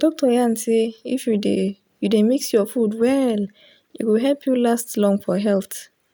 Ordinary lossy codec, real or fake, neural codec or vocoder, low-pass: none; real; none; none